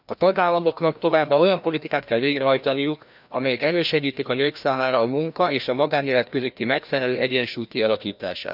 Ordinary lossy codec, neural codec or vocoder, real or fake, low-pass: none; codec, 16 kHz, 1 kbps, FreqCodec, larger model; fake; 5.4 kHz